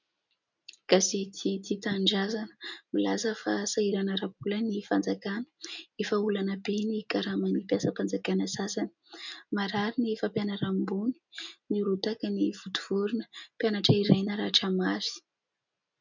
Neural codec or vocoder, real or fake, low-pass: none; real; 7.2 kHz